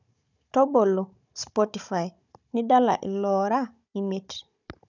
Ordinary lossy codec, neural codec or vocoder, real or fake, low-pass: none; codec, 16 kHz, 16 kbps, FunCodec, trained on Chinese and English, 50 frames a second; fake; 7.2 kHz